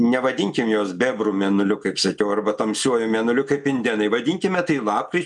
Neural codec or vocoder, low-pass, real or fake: none; 10.8 kHz; real